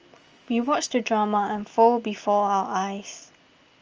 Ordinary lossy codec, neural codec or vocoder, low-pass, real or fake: Opus, 24 kbps; none; 7.2 kHz; real